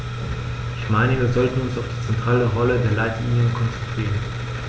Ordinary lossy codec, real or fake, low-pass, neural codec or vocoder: none; real; none; none